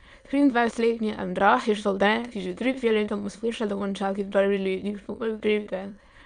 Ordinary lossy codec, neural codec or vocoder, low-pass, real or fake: Opus, 64 kbps; autoencoder, 22.05 kHz, a latent of 192 numbers a frame, VITS, trained on many speakers; 9.9 kHz; fake